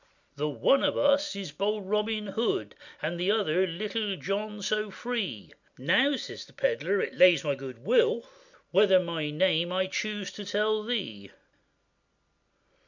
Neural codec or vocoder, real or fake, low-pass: none; real; 7.2 kHz